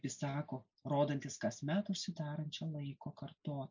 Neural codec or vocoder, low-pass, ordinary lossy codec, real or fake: none; 7.2 kHz; MP3, 64 kbps; real